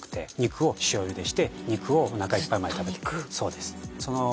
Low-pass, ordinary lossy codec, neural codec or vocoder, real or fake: none; none; none; real